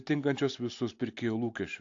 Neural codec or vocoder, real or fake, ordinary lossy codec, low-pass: none; real; AAC, 48 kbps; 7.2 kHz